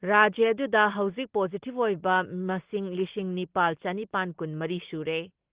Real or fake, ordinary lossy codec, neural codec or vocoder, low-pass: real; Opus, 16 kbps; none; 3.6 kHz